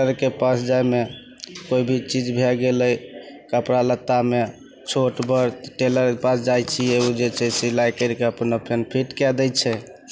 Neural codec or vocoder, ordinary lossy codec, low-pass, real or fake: none; none; none; real